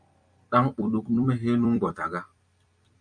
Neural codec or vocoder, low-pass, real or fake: vocoder, 44.1 kHz, 128 mel bands every 256 samples, BigVGAN v2; 9.9 kHz; fake